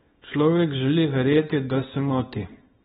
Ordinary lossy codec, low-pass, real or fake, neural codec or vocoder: AAC, 16 kbps; 10.8 kHz; fake; codec, 24 kHz, 0.9 kbps, WavTokenizer, small release